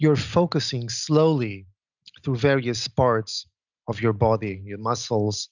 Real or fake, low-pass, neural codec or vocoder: real; 7.2 kHz; none